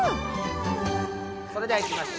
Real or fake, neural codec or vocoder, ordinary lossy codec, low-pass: real; none; none; none